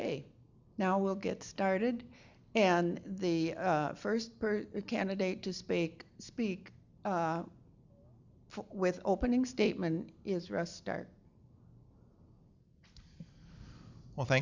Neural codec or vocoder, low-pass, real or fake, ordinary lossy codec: none; 7.2 kHz; real; Opus, 64 kbps